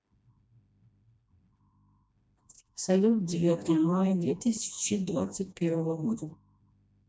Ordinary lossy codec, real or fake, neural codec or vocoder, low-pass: none; fake; codec, 16 kHz, 1 kbps, FreqCodec, smaller model; none